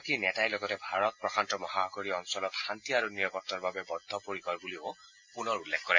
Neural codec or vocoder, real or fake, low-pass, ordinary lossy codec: none; real; 7.2 kHz; MP3, 48 kbps